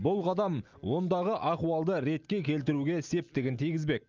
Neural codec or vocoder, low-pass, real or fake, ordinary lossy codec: none; 7.2 kHz; real; Opus, 24 kbps